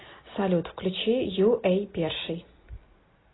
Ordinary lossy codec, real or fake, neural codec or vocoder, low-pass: AAC, 16 kbps; real; none; 7.2 kHz